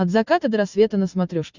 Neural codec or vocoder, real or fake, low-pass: none; real; 7.2 kHz